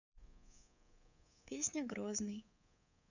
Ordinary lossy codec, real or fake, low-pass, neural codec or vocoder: none; fake; 7.2 kHz; codec, 24 kHz, 3.1 kbps, DualCodec